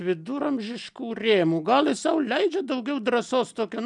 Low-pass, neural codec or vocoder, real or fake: 10.8 kHz; none; real